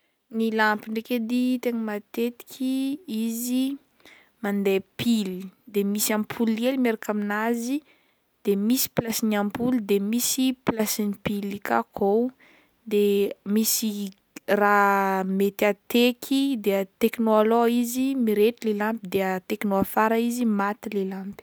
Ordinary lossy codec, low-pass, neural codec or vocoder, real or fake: none; none; none; real